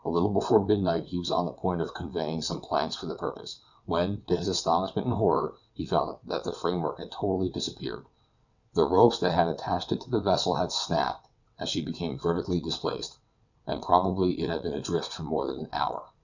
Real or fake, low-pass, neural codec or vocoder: fake; 7.2 kHz; vocoder, 22.05 kHz, 80 mel bands, WaveNeXt